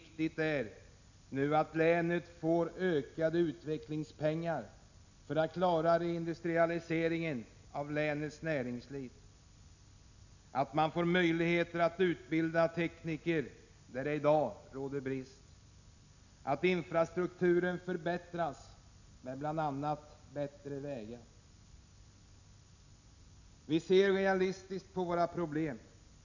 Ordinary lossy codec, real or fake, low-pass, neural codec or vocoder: none; real; 7.2 kHz; none